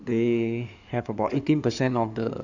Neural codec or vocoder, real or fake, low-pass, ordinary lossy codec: codec, 16 kHz in and 24 kHz out, 2.2 kbps, FireRedTTS-2 codec; fake; 7.2 kHz; none